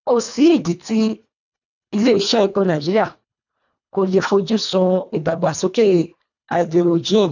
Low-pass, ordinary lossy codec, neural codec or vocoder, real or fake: 7.2 kHz; none; codec, 24 kHz, 1.5 kbps, HILCodec; fake